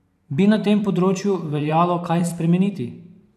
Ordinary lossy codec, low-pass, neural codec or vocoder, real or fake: none; 14.4 kHz; vocoder, 44.1 kHz, 128 mel bands every 512 samples, BigVGAN v2; fake